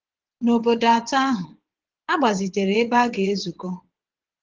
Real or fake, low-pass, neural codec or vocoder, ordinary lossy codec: fake; 7.2 kHz; vocoder, 22.05 kHz, 80 mel bands, WaveNeXt; Opus, 16 kbps